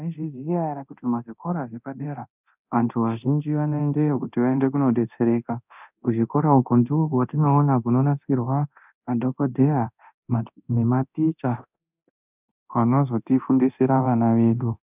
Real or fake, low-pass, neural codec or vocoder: fake; 3.6 kHz; codec, 24 kHz, 0.9 kbps, DualCodec